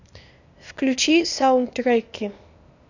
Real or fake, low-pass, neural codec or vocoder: fake; 7.2 kHz; codec, 16 kHz, 0.8 kbps, ZipCodec